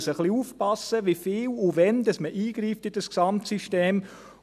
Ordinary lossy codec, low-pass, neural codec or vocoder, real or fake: none; 14.4 kHz; none; real